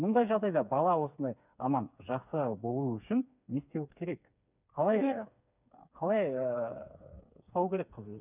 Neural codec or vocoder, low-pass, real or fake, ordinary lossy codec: codec, 16 kHz, 4 kbps, FreqCodec, smaller model; 3.6 kHz; fake; none